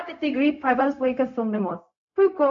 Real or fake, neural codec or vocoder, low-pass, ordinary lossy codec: fake; codec, 16 kHz, 0.4 kbps, LongCat-Audio-Codec; 7.2 kHz; AAC, 64 kbps